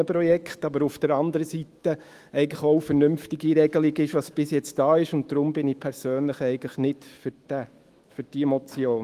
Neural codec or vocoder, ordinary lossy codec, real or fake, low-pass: autoencoder, 48 kHz, 128 numbers a frame, DAC-VAE, trained on Japanese speech; Opus, 24 kbps; fake; 14.4 kHz